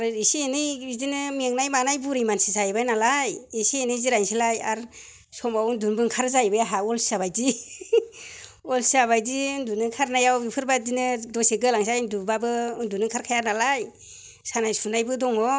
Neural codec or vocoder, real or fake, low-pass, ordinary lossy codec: none; real; none; none